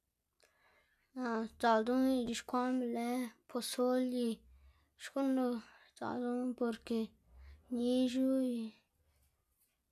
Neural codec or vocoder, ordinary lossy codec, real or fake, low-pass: none; none; real; 14.4 kHz